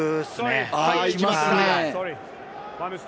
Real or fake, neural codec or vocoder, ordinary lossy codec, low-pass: real; none; none; none